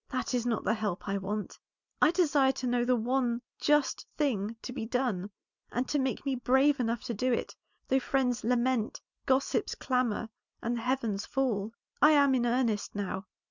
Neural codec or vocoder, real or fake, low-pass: none; real; 7.2 kHz